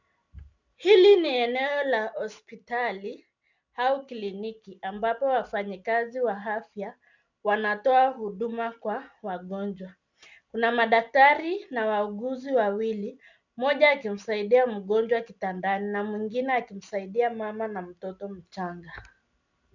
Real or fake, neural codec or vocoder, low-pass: real; none; 7.2 kHz